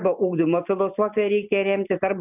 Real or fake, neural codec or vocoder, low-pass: real; none; 3.6 kHz